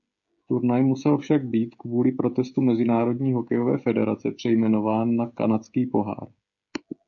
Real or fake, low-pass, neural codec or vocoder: fake; 7.2 kHz; codec, 16 kHz, 16 kbps, FreqCodec, smaller model